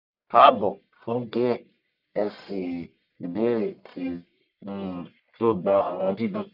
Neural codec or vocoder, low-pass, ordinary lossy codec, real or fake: codec, 44.1 kHz, 1.7 kbps, Pupu-Codec; 5.4 kHz; none; fake